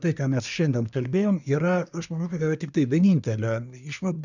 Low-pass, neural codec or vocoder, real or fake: 7.2 kHz; codec, 24 kHz, 1 kbps, SNAC; fake